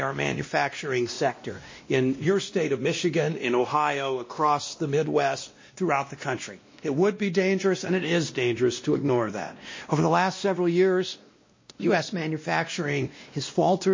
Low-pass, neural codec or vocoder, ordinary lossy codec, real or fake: 7.2 kHz; codec, 16 kHz, 1 kbps, X-Codec, WavLM features, trained on Multilingual LibriSpeech; MP3, 32 kbps; fake